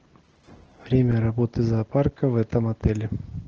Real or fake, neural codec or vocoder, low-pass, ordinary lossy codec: real; none; 7.2 kHz; Opus, 16 kbps